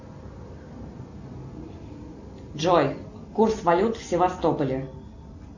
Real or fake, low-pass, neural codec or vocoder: real; 7.2 kHz; none